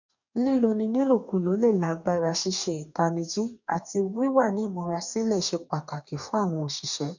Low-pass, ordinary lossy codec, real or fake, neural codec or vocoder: 7.2 kHz; none; fake; codec, 44.1 kHz, 2.6 kbps, DAC